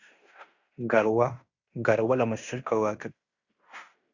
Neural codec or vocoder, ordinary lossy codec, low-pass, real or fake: codec, 16 kHz in and 24 kHz out, 0.9 kbps, LongCat-Audio-Codec, fine tuned four codebook decoder; Opus, 64 kbps; 7.2 kHz; fake